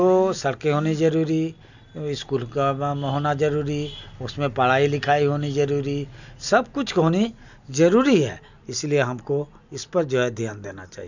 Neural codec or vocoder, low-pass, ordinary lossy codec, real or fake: none; 7.2 kHz; none; real